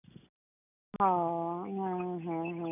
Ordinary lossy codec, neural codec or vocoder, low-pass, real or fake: none; none; 3.6 kHz; real